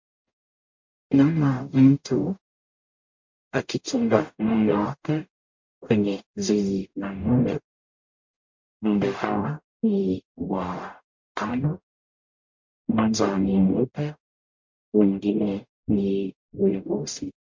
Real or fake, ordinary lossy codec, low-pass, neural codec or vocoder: fake; MP3, 48 kbps; 7.2 kHz; codec, 44.1 kHz, 0.9 kbps, DAC